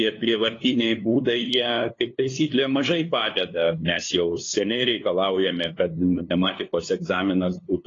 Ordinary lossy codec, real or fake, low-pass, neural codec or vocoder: AAC, 32 kbps; fake; 7.2 kHz; codec, 16 kHz, 2 kbps, FunCodec, trained on LibriTTS, 25 frames a second